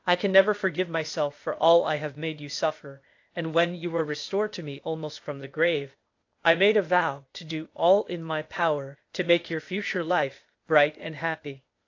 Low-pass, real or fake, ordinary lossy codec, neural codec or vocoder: 7.2 kHz; fake; AAC, 48 kbps; codec, 16 kHz, 0.8 kbps, ZipCodec